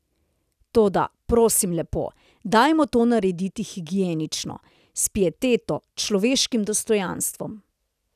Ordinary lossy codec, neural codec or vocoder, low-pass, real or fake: none; none; 14.4 kHz; real